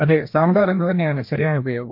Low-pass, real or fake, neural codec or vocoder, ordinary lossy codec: 5.4 kHz; fake; codec, 16 kHz, 1 kbps, FreqCodec, larger model; MP3, 32 kbps